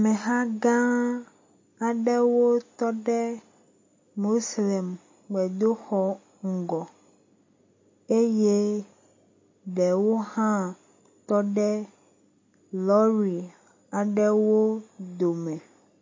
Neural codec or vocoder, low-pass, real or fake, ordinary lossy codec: none; 7.2 kHz; real; MP3, 32 kbps